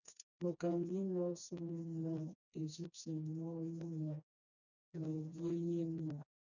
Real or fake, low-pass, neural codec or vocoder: fake; 7.2 kHz; codec, 16 kHz, 2 kbps, FreqCodec, smaller model